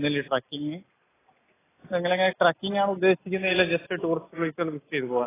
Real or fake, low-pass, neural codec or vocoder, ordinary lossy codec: real; 3.6 kHz; none; AAC, 16 kbps